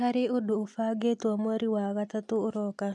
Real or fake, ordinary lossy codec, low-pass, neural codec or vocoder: real; none; none; none